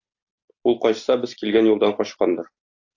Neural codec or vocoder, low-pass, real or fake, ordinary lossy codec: none; 7.2 kHz; real; AAC, 48 kbps